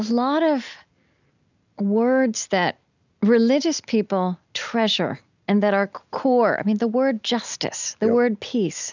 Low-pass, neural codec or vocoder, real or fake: 7.2 kHz; none; real